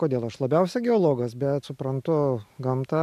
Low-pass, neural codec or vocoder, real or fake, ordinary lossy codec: 14.4 kHz; none; real; MP3, 96 kbps